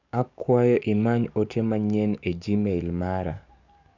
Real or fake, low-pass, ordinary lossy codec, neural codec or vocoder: fake; 7.2 kHz; none; codec, 44.1 kHz, 7.8 kbps, DAC